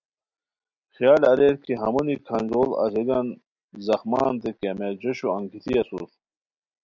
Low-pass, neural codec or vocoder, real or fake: 7.2 kHz; none; real